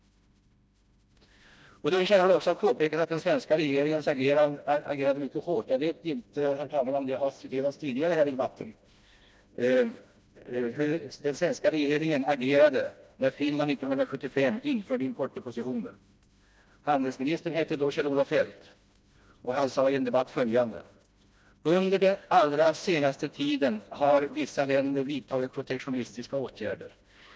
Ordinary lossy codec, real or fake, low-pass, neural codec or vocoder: none; fake; none; codec, 16 kHz, 1 kbps, FreqCodec, smaller model